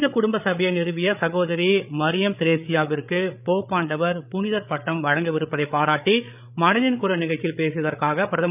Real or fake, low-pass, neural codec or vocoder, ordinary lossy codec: fake; 3.6 kHz; codec, 16 kHz, 8 kbps, FreqCodec, larger model; none